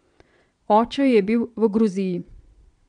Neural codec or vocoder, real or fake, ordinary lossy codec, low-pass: vocoder, 22.05 kHz, 80 mel bands, Vocos; fake; MP3, 64 kbps; 9.9 kHz